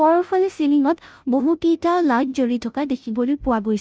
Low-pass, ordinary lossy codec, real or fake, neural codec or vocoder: none; none; fake; codec, 16 kHz, 0.5 kbps, FunCodec, trained on Chinese and English, 25 frames a second